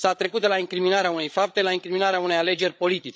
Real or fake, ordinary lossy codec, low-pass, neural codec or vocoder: fake; none; none; codec, 16 kHz, 8 kbps, FreqCodec, larger model